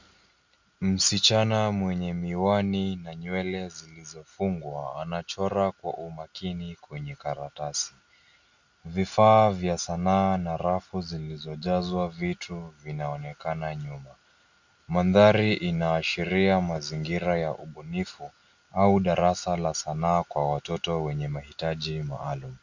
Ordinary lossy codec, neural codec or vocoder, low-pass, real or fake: Opus, 64 kbps; none; 7.2 kHz; real